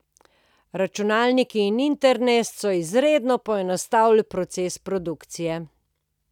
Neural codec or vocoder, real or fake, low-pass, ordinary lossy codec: none; real; 19.8 kHz; none